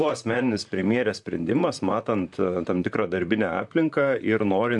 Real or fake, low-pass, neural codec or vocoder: fake; 10.8 kHz; vocoder, 44.1 kHz, 128 mel bands, Pupu-Vocoder